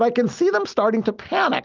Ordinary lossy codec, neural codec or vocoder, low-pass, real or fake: Opus, 24 kbps; none; 7.2 kHz; real